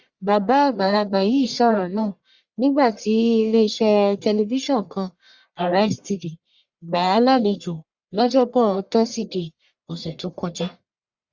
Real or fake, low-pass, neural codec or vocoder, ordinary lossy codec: fake; 7.2 kHz; codec, 44.1 kHz, 1.7 kbps, Pupu-Codec; Opus, 64 kbps